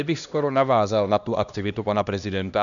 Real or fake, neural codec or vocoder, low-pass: fake; codec, 16 kHz, 1 kbps, X-Codec, HuBERT features, trained on LibriSpeech; 7.2 kHz